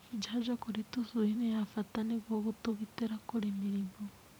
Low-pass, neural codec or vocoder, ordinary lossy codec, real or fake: none; vocoder, 44.1 kHz, 128 mel bands every 512 samples, BigVGAN v2; none; fake